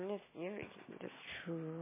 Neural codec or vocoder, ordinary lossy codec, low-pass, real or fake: none; MP3, 16 kbps; 3.6 kHz; real